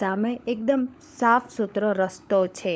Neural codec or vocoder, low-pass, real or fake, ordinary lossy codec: codec, 16 kHz, 16 kbps, FunCodec, trained on LibriTTS, 50 frames a second; none; fake; none